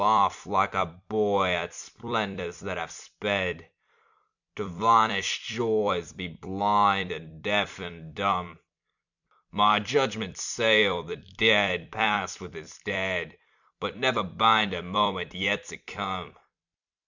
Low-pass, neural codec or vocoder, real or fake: 7.2 kHz; vocoder, 44.1 kHz, 128 mel bands every 256 samples, BigVGAN v2; fake